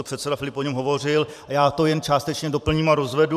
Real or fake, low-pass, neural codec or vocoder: fake; 14.4 kHz; vocoder, 44.1 kHz, 128 mel bands every 512 samples, BigVGAN v2